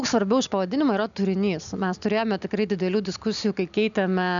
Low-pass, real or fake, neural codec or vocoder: 7.2 kHz; real; none